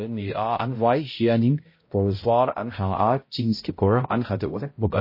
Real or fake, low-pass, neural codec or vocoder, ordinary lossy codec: fake; 5.4 kHz; codec, 16 kHz, 0.5 kbps, X-Codec, HuBERT features, trained on balanced general audio; MP3, 24 kbps